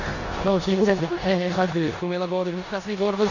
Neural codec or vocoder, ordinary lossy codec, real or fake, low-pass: codec, 16 kHz in and 24 kHz out, 0.9 kbps, LongCat-Audio-Codec, four codebook decoder; none; fake; 7.2 kHz